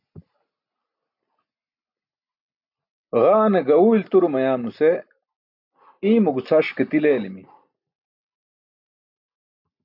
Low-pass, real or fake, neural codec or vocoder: 5.4 kHz; real; none